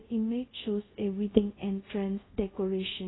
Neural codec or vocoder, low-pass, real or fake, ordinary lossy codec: codec, 24 kHz, 0.5 kbps, DualCodec; 7.2 kHz; fake; AAC, 16 kbps